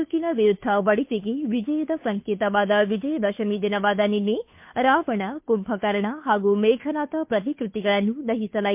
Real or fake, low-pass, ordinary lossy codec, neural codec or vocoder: fake; 3.6 kHz; MP3, 32 kbps; codec, 16 kHz, 2 kbps, FunCodec, trained on Chinese and English, 25 frames a second